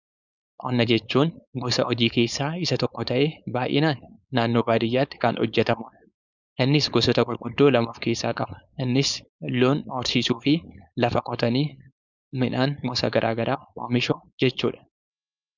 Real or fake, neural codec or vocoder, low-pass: fake; codec, 16 kHz, 4.8 kbps, FACodec; 7.2 kHz